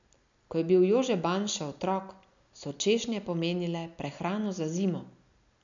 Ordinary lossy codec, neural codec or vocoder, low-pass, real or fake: none; none; 7.2 kHz; real